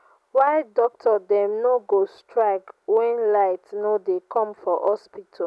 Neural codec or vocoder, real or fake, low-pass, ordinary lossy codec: none; real; none; none